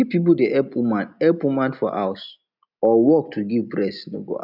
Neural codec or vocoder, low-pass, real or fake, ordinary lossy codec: none; 5.4 kHz; real; none